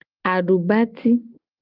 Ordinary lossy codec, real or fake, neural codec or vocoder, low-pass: Opus, 24 kbps; real; none; 5.4 kHz